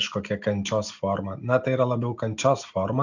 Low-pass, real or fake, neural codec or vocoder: 7.2 kHz; real; none